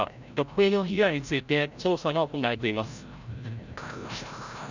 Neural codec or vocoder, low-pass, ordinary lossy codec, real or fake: codec, 16 kHz, 0.5 kbps, FreqCodec, larger model; 7.2 kHz; none; fake